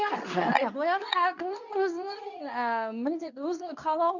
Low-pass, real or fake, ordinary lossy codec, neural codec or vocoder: 7.2 kHz; fake; none; codec, 24 kHz, 0.9 kbps, WavTokenizer, medium speech release version 2